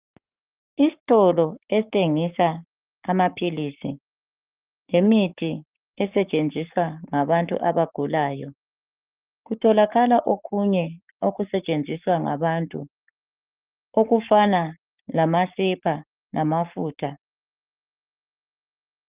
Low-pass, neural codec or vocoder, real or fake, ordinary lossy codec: 3.6 kHz; codec, 44.1 kHz, 7.8 kbps, Pupu-Codec; fake; Opus, 32 kbps